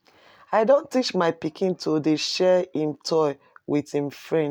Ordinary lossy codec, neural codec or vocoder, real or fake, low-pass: none; none; real; 19.8 kHz